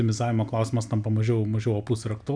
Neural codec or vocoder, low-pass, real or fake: none; 9.9 kHz; real